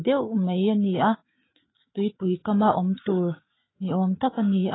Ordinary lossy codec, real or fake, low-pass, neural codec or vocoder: AAC, 16 kbps; fake; 7.2 kHz; autoencoder, 48 kHz, 128 numbers a frame, DAC-VAE, trained on Japanese speech